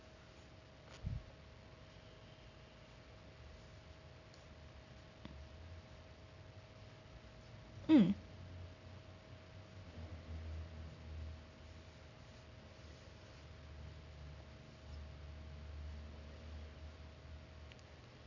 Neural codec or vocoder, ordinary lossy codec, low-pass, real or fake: none; none; 7.2 kHz; real